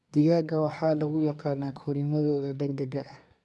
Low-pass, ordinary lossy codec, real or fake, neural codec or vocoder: none; none; fake; codec, 24 kHz, 1 kbps, SNAC